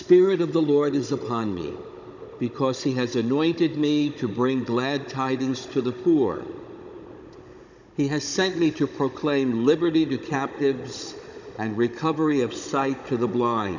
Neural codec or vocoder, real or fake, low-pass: codec, 16 kHz, 16 kbps, FunCodec, trained on Chinese and English, 50 frames a second; fake; 7.2 kHz